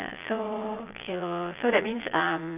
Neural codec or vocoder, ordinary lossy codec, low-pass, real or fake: vocoder, 22.05 kHz, 80 mel bands, Vocos; none; 3.6 kHz; fake